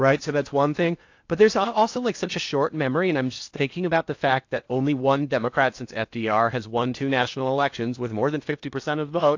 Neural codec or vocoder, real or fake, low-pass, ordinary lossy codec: codec, 16 kHz in and 24 kHz out, 0.8 kbps, FocalCodec, streaming, 65536 codes; fake; 7.2 kHz; AAC, 48 kbps